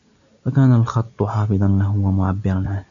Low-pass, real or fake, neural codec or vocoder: 7.2 kHz; real; none